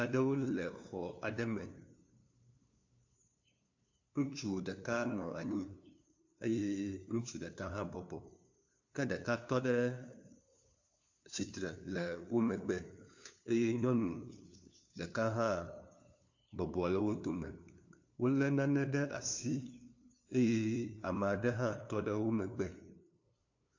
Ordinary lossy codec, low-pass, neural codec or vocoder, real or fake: AAC, 48 kbps; 7.2 kHz; codec, 16 kHz, 2 kbps, FunCodec, trained on LibriTTS, 25 frames a second; fake